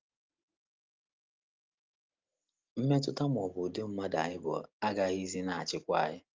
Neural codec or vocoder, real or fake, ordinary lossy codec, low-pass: none; real; Opus, 32 kbps; 7.2 kHz